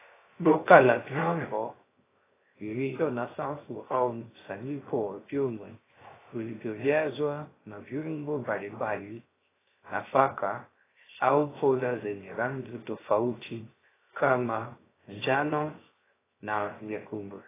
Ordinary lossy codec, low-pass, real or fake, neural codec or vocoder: AAC, 16 kbps; 3.6 kHz; fake; codec, 16 kHz, 0.3 kbps, FocalCodec